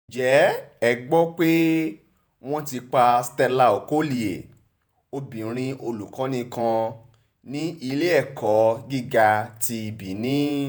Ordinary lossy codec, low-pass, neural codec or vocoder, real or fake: none; none; vocoder, 48 kHz, 128 mel bands, Vocos; fake